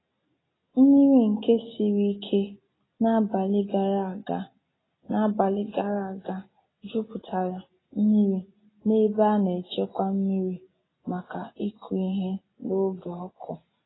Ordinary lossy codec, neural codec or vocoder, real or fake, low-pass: AAC, 16 kbps; none; real; 7.2 kHz